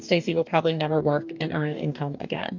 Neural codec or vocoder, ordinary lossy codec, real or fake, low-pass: codec, 44.1 kHz, 2.6 kbps, DAC; MP3, 64 kbps; fake; 7.2 kHz